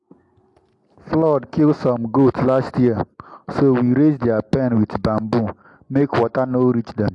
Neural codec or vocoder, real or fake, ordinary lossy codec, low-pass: none; real; AAC, 64 kbps; 10.8 kHz